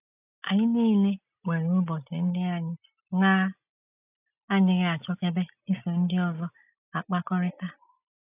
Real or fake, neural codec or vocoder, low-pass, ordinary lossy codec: real; none; 3.6 kHz; none